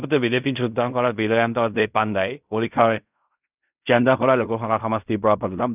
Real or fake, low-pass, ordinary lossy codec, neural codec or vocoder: fake; 3.6 kHz; none; codec, 16 kHz in and 24 kHz out, 0.4 kbps, LongCat-Audio-Codec, fine tuned four codebook decoder